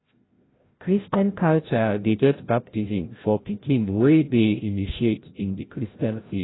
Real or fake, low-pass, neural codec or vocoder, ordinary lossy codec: fake; 7.2 kHz; codec, 16 kHz, 0.5 kbps, FreqCodec, larger model; AAC, 16 kbps